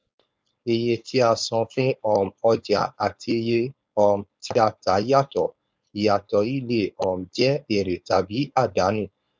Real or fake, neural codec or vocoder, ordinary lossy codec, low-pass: fake; codec, 16 kHz, 4.8 kbps, FACodec; none; none